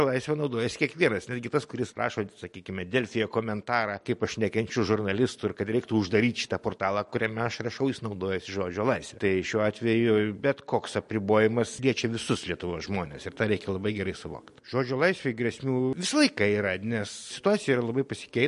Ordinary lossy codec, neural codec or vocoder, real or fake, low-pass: MP3, 48 kbps; none; real; 14.4 kHz